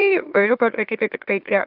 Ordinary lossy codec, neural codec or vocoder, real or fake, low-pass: AAC, 48 kbps; autoencoder, 44.1 kHz, a latent of 192 numbers a frame, MeloTTS; fake; 5.4 kHz